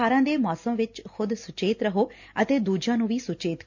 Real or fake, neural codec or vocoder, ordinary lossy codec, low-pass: real; none; none; 7.2 kHz